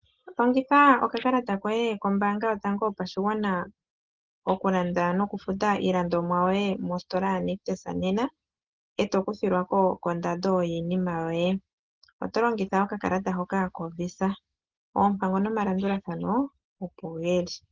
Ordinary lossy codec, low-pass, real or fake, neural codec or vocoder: Opus, 16 kbps; 7.2 kHz; real; none